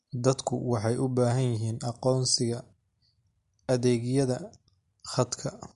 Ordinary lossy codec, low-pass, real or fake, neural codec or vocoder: MP3, 48 kbps; 14.4 kHz; real; none